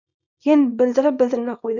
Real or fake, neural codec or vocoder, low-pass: fake; codec, 24 kHz, 0.9 kbps, WavTokenizer, small release; 7.2 kHz